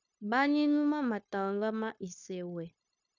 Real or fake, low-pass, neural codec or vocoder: fake; 7.2 kHz; codec, 16 kHz, 0.9 kbps, LongCat-Audio-Codec